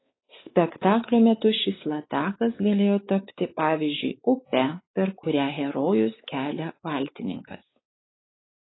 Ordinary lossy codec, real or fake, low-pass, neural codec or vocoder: AAC, 16 kbps; fake; 7.2 kHz; codec, 16 kHz, 4 kbps, X-Codec, WavLM features, trained on Multilingual LibriSpeech